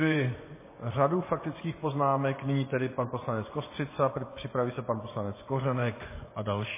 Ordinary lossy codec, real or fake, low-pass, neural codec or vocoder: MP3, 16 kbps; fake; 3.6 kHz; vocoder, 24 kHz, 100 mel bands, Vocos